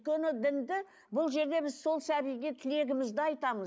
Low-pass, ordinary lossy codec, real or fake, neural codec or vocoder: none; none; real; none